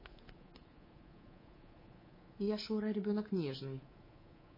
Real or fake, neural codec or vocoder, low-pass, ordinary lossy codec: fake; codec, 24 kHz, 3.1 kbps, DualCodec; 5.4 kHz; MP3, 24 kbps